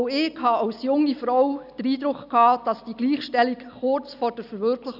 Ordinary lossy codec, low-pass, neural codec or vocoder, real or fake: none; 5.4 kHz; none; real